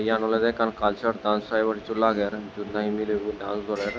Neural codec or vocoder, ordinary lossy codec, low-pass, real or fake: none; none; none; real